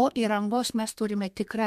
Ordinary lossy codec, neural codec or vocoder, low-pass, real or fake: MP3, 96 kbps; codec, 32 kHz, 1.9 kbps, SNAC; 14.4 kHz; fake